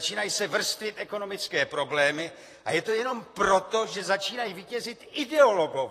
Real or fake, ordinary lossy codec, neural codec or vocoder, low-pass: fake; AAC, 48 kbps; vocoder, 44.1 kHz, 128 mel bands, Pupu-Vocoder; 14.4 kHz